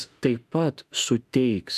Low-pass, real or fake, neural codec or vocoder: 14.4 kHz; fake; autoencoder, 48 kHz, 32 numbers a frame, DAC-VAE, trained on Japanese speech